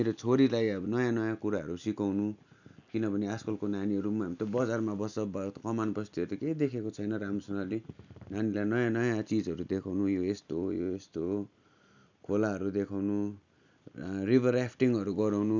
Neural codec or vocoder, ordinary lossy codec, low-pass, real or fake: none; none; 7.2 kHz; real